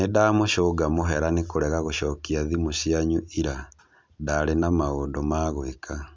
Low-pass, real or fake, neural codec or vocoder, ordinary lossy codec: none; real; none; none